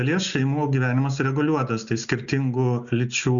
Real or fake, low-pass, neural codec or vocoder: real; 7.2 kHz; none